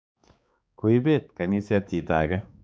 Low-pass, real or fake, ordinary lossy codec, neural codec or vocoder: none; fake; none; codec, 16 kHz, 4 kbps, X-Codec, HuBERT features, trained on balanced general audio